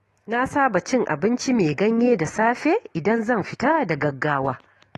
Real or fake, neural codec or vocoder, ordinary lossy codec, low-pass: fake; autoencoder, 48 kHz, 128 numbers a frame, DAC-VAE, trained on Japanese speech; AAC, 32 kbps; 19.8 kHz